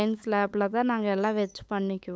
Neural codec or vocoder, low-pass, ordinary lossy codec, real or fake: codec, 16 kHz, 8 kbps, FunCodec, trained on LibriTTS, 25 frames a second; none; none; fake